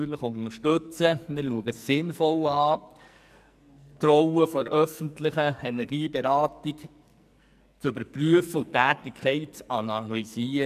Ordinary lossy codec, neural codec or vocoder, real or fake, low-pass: none; codec, 44.1 kHz, 2.6 kbps, SNAC; fake; 14.4 kHz